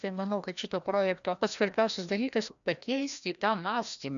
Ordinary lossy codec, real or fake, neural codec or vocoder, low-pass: MP3, 96 kbps; fake; codec, 16 kHz, 1 kbps, FreqCodec, larger model; 7.2 kHz